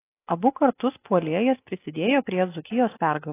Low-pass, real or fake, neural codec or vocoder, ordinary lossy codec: 3.6 kHz; real; none; AAC, 24 kbps